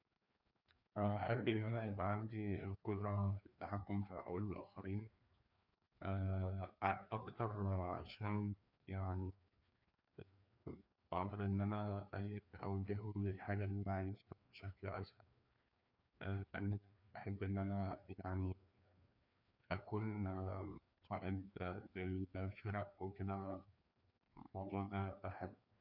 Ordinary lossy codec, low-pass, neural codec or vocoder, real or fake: none; 5.4 kHz; codec, 16 kHz, 2 kbps, FreqCodec, larger model; fake